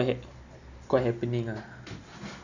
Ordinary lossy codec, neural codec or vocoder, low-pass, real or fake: none; none; 7.2 kHz; real